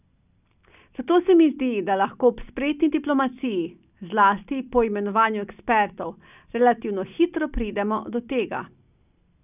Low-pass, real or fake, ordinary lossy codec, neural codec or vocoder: 3.6 kHz; real; none; none